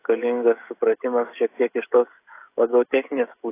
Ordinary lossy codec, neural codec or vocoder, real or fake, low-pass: AAC, 24 kbps; codec, 16 kHz, 16 kbps, FreqCodec, smaller model; fake; 3.6 kHz